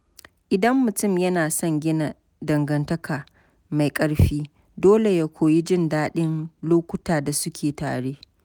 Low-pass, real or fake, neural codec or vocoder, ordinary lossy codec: none; real; none; none